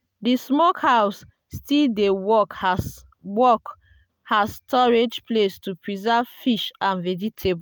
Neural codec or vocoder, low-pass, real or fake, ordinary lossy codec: none; none; real; none